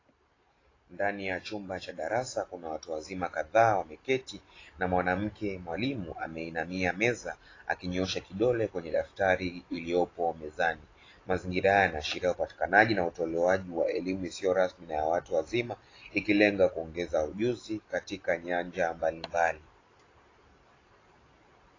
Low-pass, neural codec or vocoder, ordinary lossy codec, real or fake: 7.2 kHz; none; AAC, 32 kbps; real